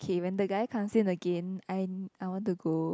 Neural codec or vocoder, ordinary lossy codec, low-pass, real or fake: none; none; none; real